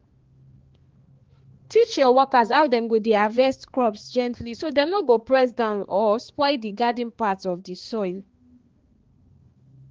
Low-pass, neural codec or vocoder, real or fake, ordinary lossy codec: 7.2 kHz; codec, 16 kHz, 2 kbps, X-Codec, HuBERT features, trained on balanced general audio; fake; Opus, 16 kbps